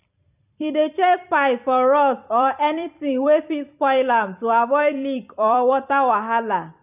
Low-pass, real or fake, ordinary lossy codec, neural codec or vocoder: 3.6 kHz; fake; none; vocoder, 44.1 kHz, 128 mel bands every 256 samples, BigVGAN v2